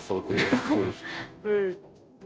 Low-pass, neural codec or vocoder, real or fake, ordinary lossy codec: none; codec, 16 kHz, 0.5 kbps, FunCodec, trained on Chinese and English, 25 frames a second; fake; none